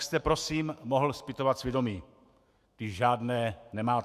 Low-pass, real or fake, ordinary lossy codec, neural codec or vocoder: 14.4 kHz; fake; Opus, 64 kbps; autoencoder, 48 kHz, 128 numbers a frame, DAC-VAE, trained on Japanese speech